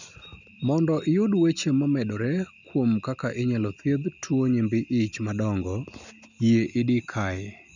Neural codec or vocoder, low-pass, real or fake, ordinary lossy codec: none; 7.2 kHz; real; none